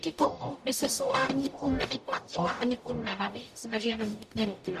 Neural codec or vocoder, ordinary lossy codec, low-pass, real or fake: codec, 44.1 kHz, 0.9 kbps, DAC; AAC, 96 kbps; 14.4 kHz; fake